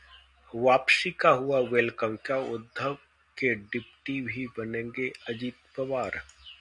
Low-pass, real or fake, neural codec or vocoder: 10.8 kHz; real; none